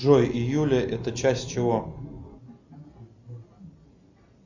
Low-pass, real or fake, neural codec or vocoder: 7.2 kHz; real; none